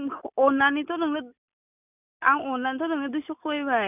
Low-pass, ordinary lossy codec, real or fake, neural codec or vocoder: 3.6 kHz; none; real; none